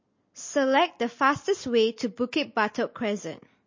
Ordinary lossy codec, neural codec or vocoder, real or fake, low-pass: MP3, 32 kbps; none; real; 7.2 kHz